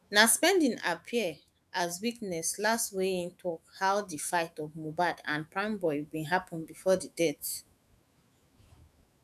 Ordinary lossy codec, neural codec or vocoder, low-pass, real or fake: none; autoencoder, 48 kHz, 128 numbers a frame, DAC-VAE, trained on Japanese speech; 14.4 kHz; fake